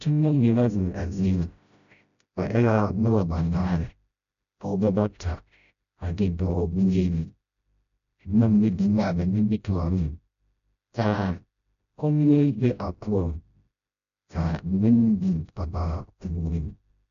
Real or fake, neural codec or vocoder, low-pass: fake; codec, 16 kHz, 0.5 kbps, FreqCodec, smaller model; 7.2 kHz